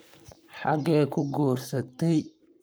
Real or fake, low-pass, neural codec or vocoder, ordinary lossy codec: fake; none; codec, 44.1 kHz, 7.8 kbps, Pupu-Codec; none